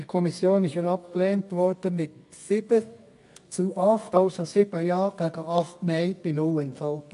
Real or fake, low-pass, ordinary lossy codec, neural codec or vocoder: fake; 10.8 kHz; AAC, 64 kbps; codec, 24 kHz, 0.9 kbps, WavTokenizer, medium music audio release